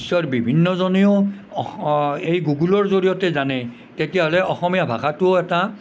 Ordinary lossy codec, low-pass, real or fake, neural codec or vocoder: none; none; real; none